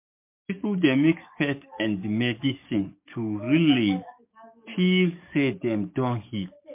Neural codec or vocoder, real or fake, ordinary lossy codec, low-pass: codec, 44.1 kHz, 7.8 kbps, DAC; fake; MP3, 32 kbps; 3.6 kHz